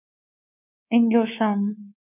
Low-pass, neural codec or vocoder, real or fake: 3.6 kHz; codec, 24 kHz, 3.1 kbps, DualCodec; fake